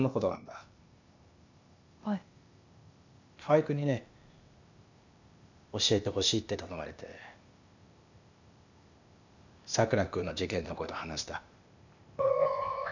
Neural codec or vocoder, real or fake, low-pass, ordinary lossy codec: codec, 16 kHz, 0.8 kbps, ZipCodec; fake; 7.2 kHz; none